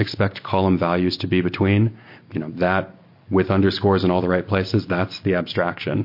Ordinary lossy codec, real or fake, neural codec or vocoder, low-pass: MP3, 32 kbps; real; none; 5.4 kHz